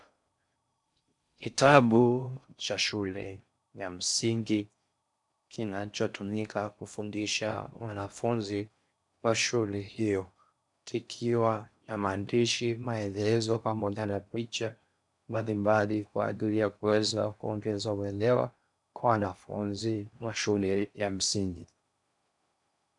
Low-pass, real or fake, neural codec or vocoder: 10.8 kHz; fake; codec, 16 kHz in and 24 kHz out, 0.6 kbps, FocalCodec, streaming, 4096 codes